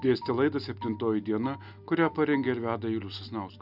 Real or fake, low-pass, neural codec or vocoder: real; 5.4 kHz; none